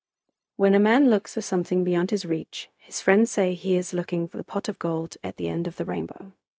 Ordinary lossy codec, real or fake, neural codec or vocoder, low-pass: none; fake; codec, 16 kHz, 0.4 kbps, LongCat-Audio-Codec; none